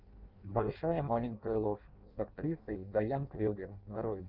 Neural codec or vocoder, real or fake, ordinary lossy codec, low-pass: codec, 16 kHz in and 24 kHz out, 0.6 kbps, FireRedTTS-2 codec; fake; Opus, 32 kbps; 5.4 kHz